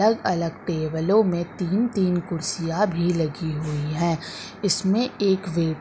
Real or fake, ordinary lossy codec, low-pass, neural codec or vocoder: real; none; none; none